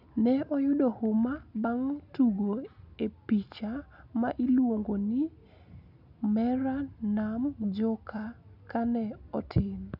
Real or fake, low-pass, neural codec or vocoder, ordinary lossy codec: real; 5.4 kHz; none; none